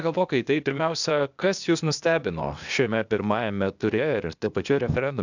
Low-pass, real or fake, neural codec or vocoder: 7.2 kHz; fake; codec, 16 kHz, 0.8 kbps, ZipCodec